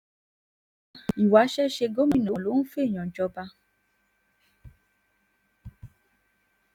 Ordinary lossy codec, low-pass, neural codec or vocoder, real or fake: none; 19.8 kHz; none; real